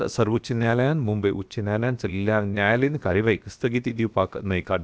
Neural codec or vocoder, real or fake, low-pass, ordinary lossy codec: codec, 16 kHz, about 1 kbps, DyCAST, with the encoder's durations; fake; none; none